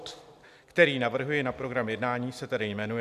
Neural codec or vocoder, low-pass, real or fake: none; 14.4 kHz; real